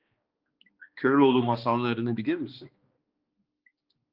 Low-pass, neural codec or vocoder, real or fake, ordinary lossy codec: 5.4 kHz; codec, 16 kHz, 2 kbps, X-Codec, HuBERT features, trained on balanced general audio; fake; Opus, 24 kbps